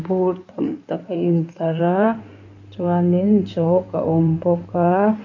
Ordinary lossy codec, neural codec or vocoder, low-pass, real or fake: none; codec, 16 kHz in and 24 kHz out, 2.2 kbps, FireRedTTS-2 codec; 7.2 kHz; fake